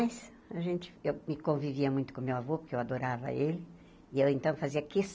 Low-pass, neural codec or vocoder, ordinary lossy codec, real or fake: none; none; none; real